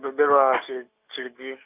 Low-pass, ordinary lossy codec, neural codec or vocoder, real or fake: 3.6 kHz; none; none; real